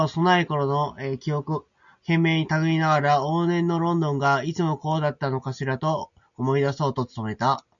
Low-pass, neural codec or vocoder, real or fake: 7.2 kHz; none; real